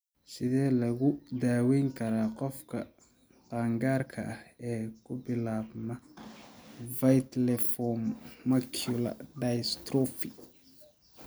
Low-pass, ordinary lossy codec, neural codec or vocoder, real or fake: none; none; none; real